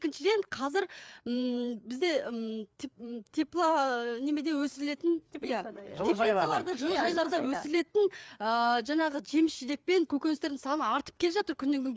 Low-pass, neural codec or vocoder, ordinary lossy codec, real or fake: none; codec, 16 kHz, 4 kbps, FreqCodec, larger model; none; fake